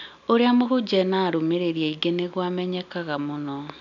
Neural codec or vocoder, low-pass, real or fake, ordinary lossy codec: none; 7.2 kHz; real; none